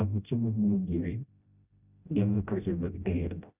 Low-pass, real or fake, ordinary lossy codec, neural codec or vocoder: 3.6 kHz; fake; none; codec, 16 kHz, 0.5 kbps, FreqCodec, smaller model